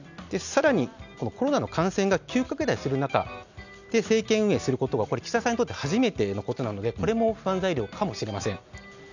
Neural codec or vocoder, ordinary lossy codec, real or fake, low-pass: none; none; real; 7.2 kHz